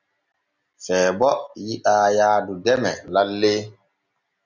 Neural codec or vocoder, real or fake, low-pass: none; real; 7.2 kHz